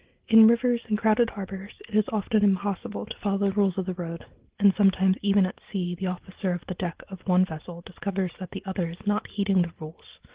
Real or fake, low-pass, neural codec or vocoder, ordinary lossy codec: real; 3.6 kHz; none; Opus, 16 kbps